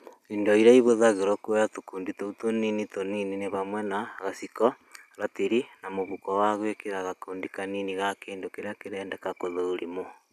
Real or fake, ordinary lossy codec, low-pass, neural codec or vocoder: real; none; 14.4 kHz; none